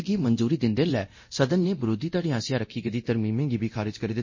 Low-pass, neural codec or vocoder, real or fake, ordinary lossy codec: 7.2 kHz; codec, 24 kHz, 0.9 kbps, DualCodec; fake; MP3, 32 kbps